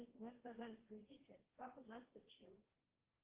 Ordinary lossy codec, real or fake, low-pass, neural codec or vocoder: Opus, 16 kbps; fake; 3.6 kHz; codec, 16 kHz, 1.1 kbps, Voila-Tokenizer